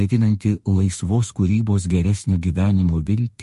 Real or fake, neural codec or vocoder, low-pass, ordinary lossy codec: fake; autoencoder, 48 kHz, 32 numbers a frame, DAC-VAE, trained on Japanese speech; 14.4 kHz; MP3, 48 kbps